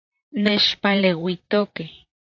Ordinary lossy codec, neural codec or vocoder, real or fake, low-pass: AAC, 48 kbps; vocoder, 44.1 kHz, 128 mel bands, Pupu-Vocoder; fake; 7.2 kHz